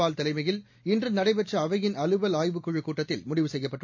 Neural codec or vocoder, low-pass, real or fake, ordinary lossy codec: none; 7.2 kHz; real; MP3, 48 kbps